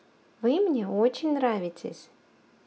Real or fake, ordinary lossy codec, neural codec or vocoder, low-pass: real; none; none; none